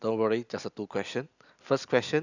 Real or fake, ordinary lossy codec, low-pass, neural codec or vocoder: real; none; 7.2 kHz; none